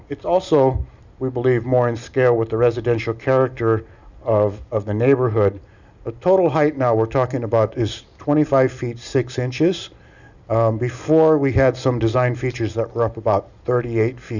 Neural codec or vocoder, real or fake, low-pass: none; real; 7.2 kHz